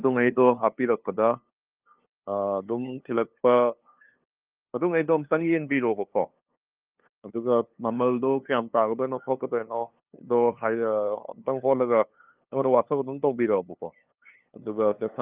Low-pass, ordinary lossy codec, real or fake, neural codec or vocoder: 3.6 kHz; Opus, 24 kbps; fake; codec, 16 kHz, 2 kbps, FunCodec, trained on LibriTTS, 25 frames a second